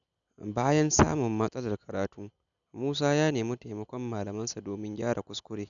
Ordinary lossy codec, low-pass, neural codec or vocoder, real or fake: none; 7.2 kHz; none; real